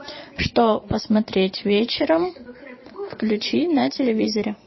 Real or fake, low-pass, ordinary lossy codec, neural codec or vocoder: fake; 7.2 kHz; MP3, 24 kbps; vocoder, 22.05 kHz, 80 mel bands, Vocos